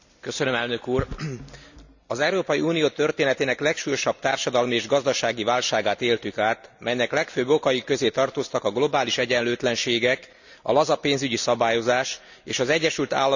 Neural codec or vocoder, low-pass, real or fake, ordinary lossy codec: none; 7.2 kHz; real; none